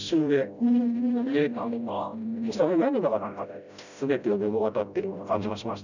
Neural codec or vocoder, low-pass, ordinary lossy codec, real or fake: codec, 16 kHz, 0.5 kbps, FreqCodec, smaller model; 7.2 kHz; none; fake